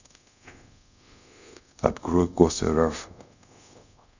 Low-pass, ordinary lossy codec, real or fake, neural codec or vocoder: 7.2 kHz; none; fake; codec, 24 kHz, 0.5 kbps, DualCodec